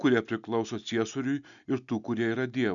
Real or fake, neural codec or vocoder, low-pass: real; none; 7.2 kHz